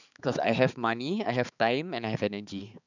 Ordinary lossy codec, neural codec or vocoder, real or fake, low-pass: none; codec, 16 kHz, 4 kbps, X-Codec, HuBERT features, trained on balanced general audio; fake; 7.2 kHz